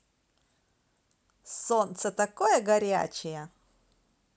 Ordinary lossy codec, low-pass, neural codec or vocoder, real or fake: none; none; none; real